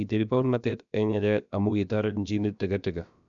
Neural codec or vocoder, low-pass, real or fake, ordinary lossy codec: codec, 16 kHz, about 1 kbps, DyCAST, with the encoder's durations; 7.2 kHz; fake; none